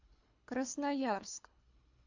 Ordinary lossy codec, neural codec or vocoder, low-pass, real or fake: AAC, 48 kbps; codec, 24 kHz, 3 kbps, HILCodec; 7.2 kHz; fake